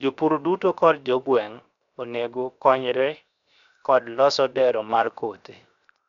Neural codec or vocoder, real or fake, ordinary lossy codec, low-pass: codec, 16 kHz, 0.7 kbps, FocalCodec; fake; none; 7.2 kHz